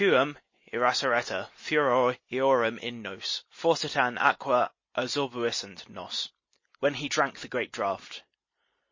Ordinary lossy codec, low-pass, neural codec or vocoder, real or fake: MP3, 32 kbps; 7.2 kHz; none; real